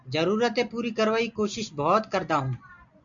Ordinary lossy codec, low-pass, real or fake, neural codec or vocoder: MP3, 64 kbps; 7.2 kHz; real; none